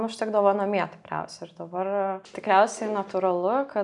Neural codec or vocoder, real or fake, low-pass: none; real; 10.8 kHz